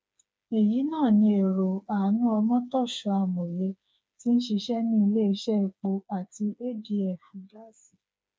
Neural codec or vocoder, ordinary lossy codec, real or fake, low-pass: codec, 16 kHz, 4 kbps, FreqCodec, smaller model; none; fake; none